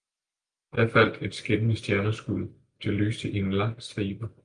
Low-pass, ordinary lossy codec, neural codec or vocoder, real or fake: 9.9 kHz; Opus, 32 kbps; none; real